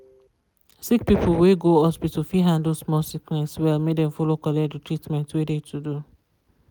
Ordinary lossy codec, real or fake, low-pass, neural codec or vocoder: none; real; none; none